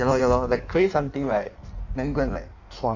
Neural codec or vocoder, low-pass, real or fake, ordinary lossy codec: codec, 16 kHz in and 24 kHz out, 1.1 kbps, FireRedTTS-2 codec; 7.2 kHz; fake; none